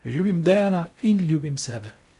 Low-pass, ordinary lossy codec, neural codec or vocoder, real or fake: 10.8 kHz; none; codec, 16 kHz in and 24 kHz out, 0.6 kbps, FocalCodec, streaming, 4096 codes; fake